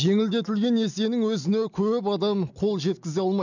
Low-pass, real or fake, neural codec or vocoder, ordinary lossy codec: 7.2 kHz; real; none; none